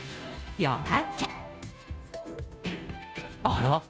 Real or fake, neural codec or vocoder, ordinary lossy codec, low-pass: fake; codec, 16 kHz, 0.5 kbps, FunCodec, trained on Chinese and English, 25 frames a second; none; none